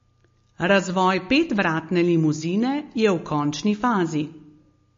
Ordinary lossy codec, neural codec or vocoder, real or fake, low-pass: MP3, 32 kbps; none; real; 7.2 kHz